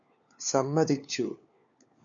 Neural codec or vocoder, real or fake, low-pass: codec, 16 kHz, 4 kbps, FunCodec, trained on LibriTTS, 50 frames a second; fake; 7.2 kHz